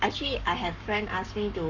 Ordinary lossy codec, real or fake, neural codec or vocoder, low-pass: none; fake; codec, 44.1 kHz, 7.8 kbps, Pupu-Codec; 7.2 kHz